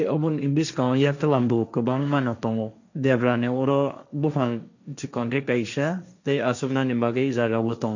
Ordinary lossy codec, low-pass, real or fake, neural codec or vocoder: none; 7.2 kHz; fake; codec, 16 kHz, 1.1 kbps, Voila-Tokenizer